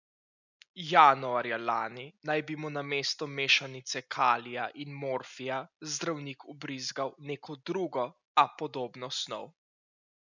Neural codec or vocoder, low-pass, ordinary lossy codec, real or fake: none; 7.2 kHz; none; real